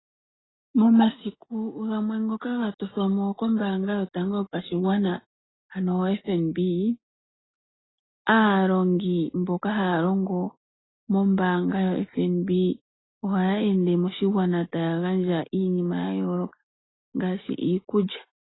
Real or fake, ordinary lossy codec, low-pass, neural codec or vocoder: real; AAC, 16 kbps; 7.2 kHz; none